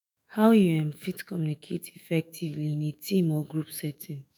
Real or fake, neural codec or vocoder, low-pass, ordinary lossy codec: fake; autoencoder, 48 kHz, 128 numbers a frame, DAC-VAE, trained on Japanese speech; none; none